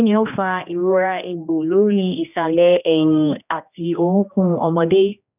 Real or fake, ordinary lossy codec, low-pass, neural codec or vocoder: fake; none; 3.6 kHz; codec, 16 kHz, 1 kbps, X-Codec, HuBERT features, trained on general audio